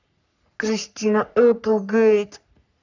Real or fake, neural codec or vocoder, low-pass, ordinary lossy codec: fake; codec, 44.1 kHz, 3.4 kbps, Pupu-Codec; 7.2 kHz; none